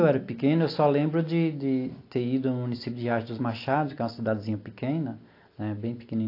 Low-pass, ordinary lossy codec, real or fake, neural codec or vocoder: 5.4 kHz; AAC, 32 kbps; real; none